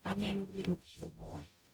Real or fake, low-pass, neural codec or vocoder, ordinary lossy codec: fake; none; codec, 44.1 kHz, 0.9 kbps, DAC; none